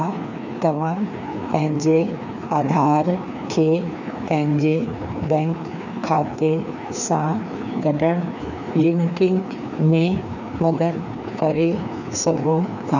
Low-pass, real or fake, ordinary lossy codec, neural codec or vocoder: 7.2 kHz; fake; none; codec, 16 kHz, 2 kbps, FreqCodec, larger model